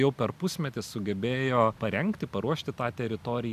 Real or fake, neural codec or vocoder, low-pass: real; none; 14.4 kHz